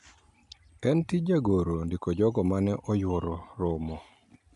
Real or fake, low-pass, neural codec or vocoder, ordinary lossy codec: real; 10.8 kHz; none; AAC, 96 kbps